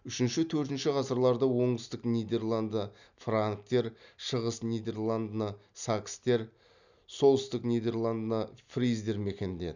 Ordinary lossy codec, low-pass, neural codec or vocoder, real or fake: none; 7.2 kHz; none; real